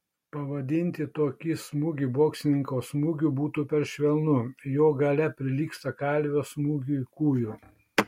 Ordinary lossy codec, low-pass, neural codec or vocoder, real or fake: MP3, 64 kbps; 19.8 kHz; none; real